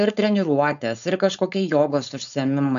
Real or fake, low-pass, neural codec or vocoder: fake; 7.2 kHz; codec, 16 kHz, 4.8 kbps, FACodec